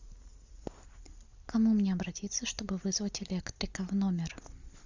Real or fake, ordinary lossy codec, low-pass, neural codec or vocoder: real; Opus, 64 kbps; 7.2 kHz; none